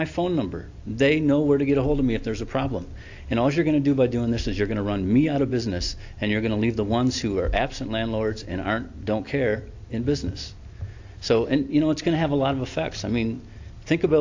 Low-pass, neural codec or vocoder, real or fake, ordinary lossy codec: 7.2 kHz; none; real; AAC, 48 kbps